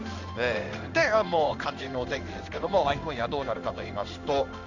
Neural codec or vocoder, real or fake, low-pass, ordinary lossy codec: codec, 16 kHz in and 24 kHz out, 1 kbps, XY-Tokenizer; fake; 7.2 kHz; none